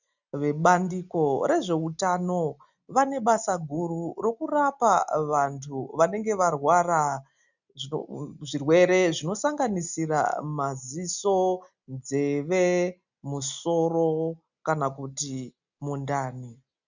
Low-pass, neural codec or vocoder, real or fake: 7.2 kHz; none; real